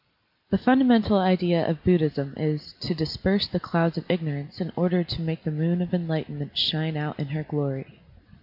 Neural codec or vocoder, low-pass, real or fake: none; 5.4 kHz; real